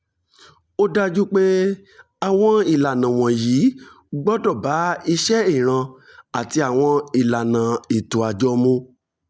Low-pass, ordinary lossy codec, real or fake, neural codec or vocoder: none; none; real; none